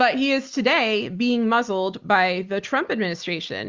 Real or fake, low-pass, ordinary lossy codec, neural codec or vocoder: real; 7.2 kHz; Opus, 32 kbps; none